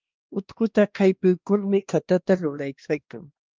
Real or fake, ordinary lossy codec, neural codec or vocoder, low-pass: fake; Opus, 24 kbps; codec, 16 kHz, 1 kbps, X-Codec, WavLM features, trained on Multilingual LibriSpeech; 7.2 kHz